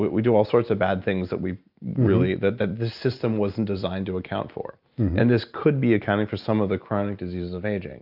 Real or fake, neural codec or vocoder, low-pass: real; none; 5.4 kHz